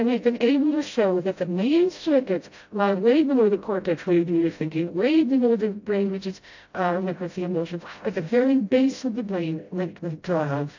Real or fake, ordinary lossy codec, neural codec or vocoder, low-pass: fake; AAC, 48 kbps; codec, 16 kHz, 0.5 kbps, FreqCodec, smaller model; 7.2 kHz